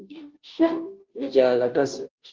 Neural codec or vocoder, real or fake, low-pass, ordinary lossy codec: codec, 16 kHz, 0.5 kbps, FunCodec, trained on Chinese and English, 25 frames a second; fake; 7.2 kHz; Opus, 32 kbps